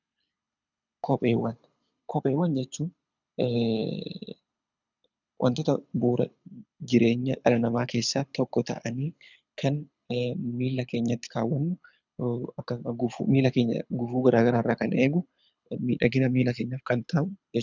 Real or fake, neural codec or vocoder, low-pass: fake; codec, 24 kHz, 6 kbps, HILCodec; 7.2 kHz